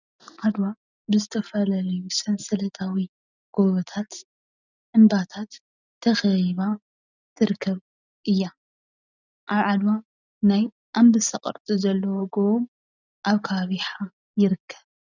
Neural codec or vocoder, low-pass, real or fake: none; 7.2 kHz; real